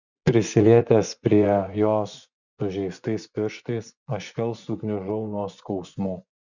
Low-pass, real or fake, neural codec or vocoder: 7.2 kHz; fake; vocoder, 24 kHz, 100 mel bands, Vocos